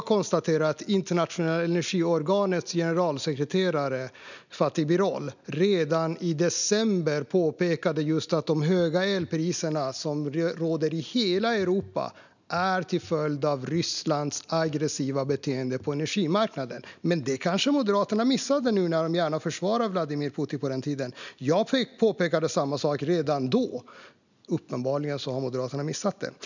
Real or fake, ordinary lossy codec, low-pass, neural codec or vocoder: real; none; 7.2 kHz; none